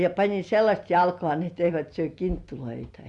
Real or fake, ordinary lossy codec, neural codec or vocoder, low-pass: real; Opus, 64 kbps; none; 10.8 kHz